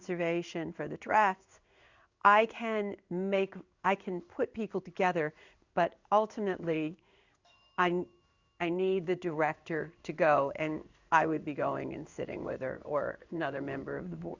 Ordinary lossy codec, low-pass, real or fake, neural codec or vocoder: Opus, 64 kbps; 7.2 kHz; fake; codec, 16 kHz in and 24 kHz out, 1 kbps, XY-Tokenizer